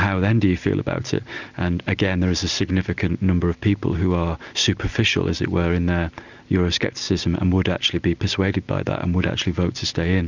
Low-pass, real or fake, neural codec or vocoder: 7.2 kHz; real; none